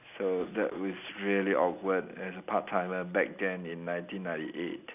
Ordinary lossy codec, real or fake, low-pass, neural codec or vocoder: none; real; 3.6 kHz; none